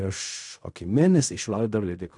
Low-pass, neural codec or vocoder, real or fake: 10.8 kHz; codec, 16 kHz in and 24 kHz out, 0.4 kbps, LongCat-Audio-Codec, fine tuned four codebook decoder; fake